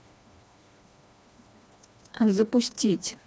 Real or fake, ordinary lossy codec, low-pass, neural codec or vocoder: fake; none; none; codec, 16 kHz, 2 kbps, FreqCodec, smaller model